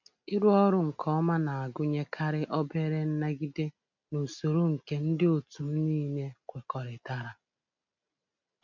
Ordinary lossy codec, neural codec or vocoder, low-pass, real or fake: MP3, 64 kbps; none; 7.2 kHz; real